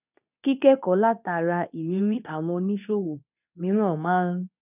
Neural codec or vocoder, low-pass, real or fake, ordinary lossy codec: codec, 24 kHz, 0.9 kbps, WavTokenizer, medium speech release version 2; 3.6 kHz; fake; none